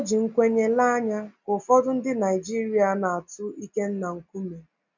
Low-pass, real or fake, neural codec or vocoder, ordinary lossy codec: 7.2 kHz; real; none; none